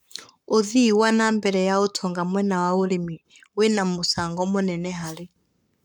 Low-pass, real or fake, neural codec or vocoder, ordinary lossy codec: 19.8 kHz; fake; codec, 44.1 kHz, 7.8 kbps, Pupu-Codec; none